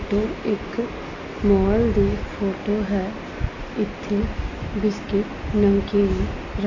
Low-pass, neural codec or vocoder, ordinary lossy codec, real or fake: 7.2 kHz; none; AAC, 32 kbps; real